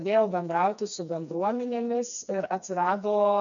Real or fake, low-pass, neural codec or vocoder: fake; 7.2 kHz; codec, 16 kHz, 2 kbps, FreqCodec, smaller model